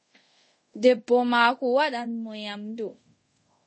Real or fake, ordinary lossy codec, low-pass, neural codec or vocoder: fake; MP3, 32 kbps; 9.9 kHz; codec, 24 kHz, 0.5 kbps, DualCodec